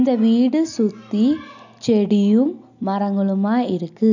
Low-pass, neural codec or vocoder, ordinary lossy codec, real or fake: 7.2 kHz; none; none; real